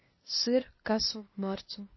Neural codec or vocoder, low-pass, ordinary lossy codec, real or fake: codec, 16 kHz, 0.8 kbps, ZipCodec; 7.2 kHz; MP3, 24 kbps; fake